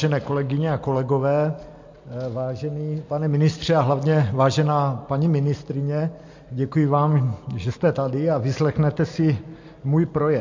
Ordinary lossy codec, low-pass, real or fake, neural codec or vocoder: MP3, 48 kbps; 7.2 kHz; real; none